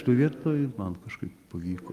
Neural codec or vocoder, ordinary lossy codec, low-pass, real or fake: none; Opus, 32 kbps; 14.4 kHz; real